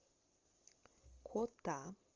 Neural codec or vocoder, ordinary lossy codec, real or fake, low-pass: none; Opus, 32 kbps; real; 7.2 kHz